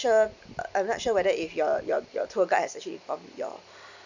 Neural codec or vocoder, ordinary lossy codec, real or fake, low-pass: none; none; real; 7.2 kHz